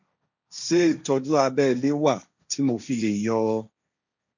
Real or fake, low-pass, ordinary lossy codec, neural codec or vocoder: fake; 7.2 kHz; none; codec, 16 kHz, 1.1 kbps, Voila-Tokenizer